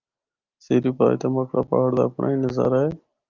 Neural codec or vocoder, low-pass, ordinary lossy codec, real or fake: none; 7.2 kHz; Opus, 32 kbps; real